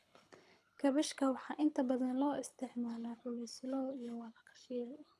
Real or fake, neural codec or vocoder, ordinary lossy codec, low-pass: fake; codec, 24 kHz, 6 kbps, HILCodec; none; none